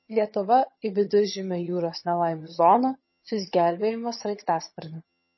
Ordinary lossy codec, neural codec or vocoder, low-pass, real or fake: MP3, 24 kbps; vocoder, 22.05 kHz, 80 mel bands, HiFi-GAN; 7.2 kHz; fake